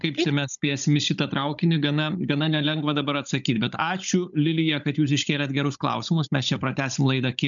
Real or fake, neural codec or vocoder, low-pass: fake; codec, 16 kHz, 16 kbps, FunCodec, trained on Chinese and English, 50 frames a second; 7.2 kHz